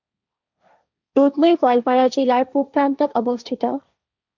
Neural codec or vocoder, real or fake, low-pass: codec, 16 kHz, 1.1 kbps, Voila-Tokenizer; fake; 7.2 kHz